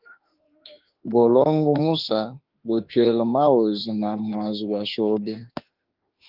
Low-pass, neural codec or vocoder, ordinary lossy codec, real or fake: 5.4 kHz; autoencoder, 48 kHz, 32 numbers a frame, DAC-VAE, trained on Japanese speech; Opus, 32 kbps; fake